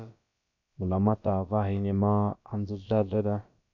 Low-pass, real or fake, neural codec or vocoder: 7.2 kHz; fake; codec, 16 kHz, about 1 kbps, DyCAST, with the encoder's durations